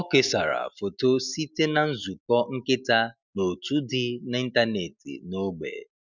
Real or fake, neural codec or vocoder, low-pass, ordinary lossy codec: real; none; 7.2 kHz; none